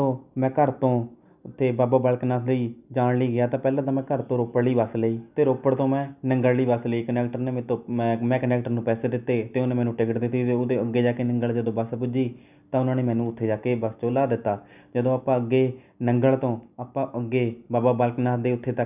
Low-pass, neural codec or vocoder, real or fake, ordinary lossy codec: 3.6 kHz; none; real; none